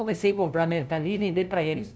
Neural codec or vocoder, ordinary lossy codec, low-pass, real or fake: codec, 16 kHz, 0.5 kbps, FunCodec, trained on LibriTTS, 25 frames a second; none; none; fake